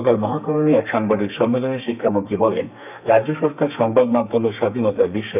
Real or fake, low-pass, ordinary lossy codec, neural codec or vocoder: fake; 3.6 kHz; none; codec, 32 kHz, 1.9 kbps, SNAC